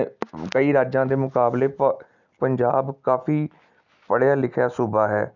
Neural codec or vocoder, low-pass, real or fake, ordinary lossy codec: none; 7.2 kHz; real; none